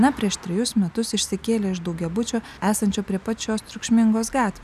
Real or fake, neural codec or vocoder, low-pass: real; none; 14.4 kHz